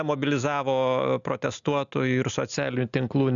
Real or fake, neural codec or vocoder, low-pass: real; none; 7.2 kHz